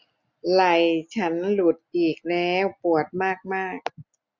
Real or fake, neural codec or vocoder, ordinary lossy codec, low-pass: real; none; none; 7.2 kHz